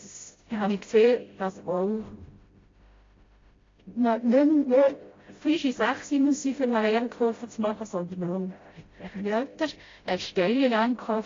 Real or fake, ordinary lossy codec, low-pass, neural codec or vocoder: fake; AAC, 32 kbps; 7.2 kHz; codec, 16 kHz, 0.5 kbps, FreqCodec, smaller model